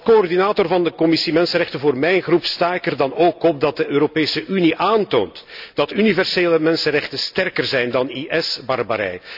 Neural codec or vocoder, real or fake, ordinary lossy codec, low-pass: none; real; none; 5.4 kHz